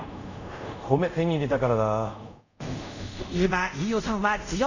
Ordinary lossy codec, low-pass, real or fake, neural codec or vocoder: none; 7.2 kHz; fake; codec, 24 kHz, 0.5 kbps, DualCodec